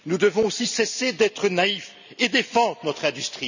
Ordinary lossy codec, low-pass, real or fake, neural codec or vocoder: none; 7.2 kHz; real; none